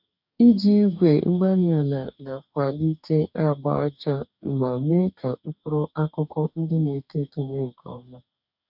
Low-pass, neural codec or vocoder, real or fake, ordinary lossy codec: 5.4 kHz; codec, 44.1 kHz, 2.6 kbps, DAC; fake; none